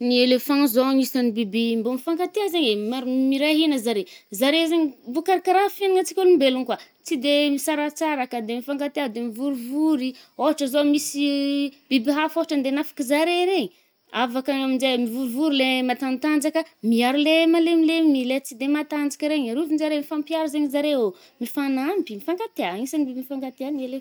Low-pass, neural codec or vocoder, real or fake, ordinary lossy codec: none; none; real; none